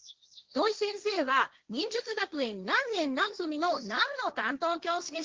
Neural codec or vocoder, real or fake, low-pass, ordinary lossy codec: codec, 16 kHz, 1.1 kbps, Voila-Tokenizer; fake; 7.2 kHz; Opus, 16 kbps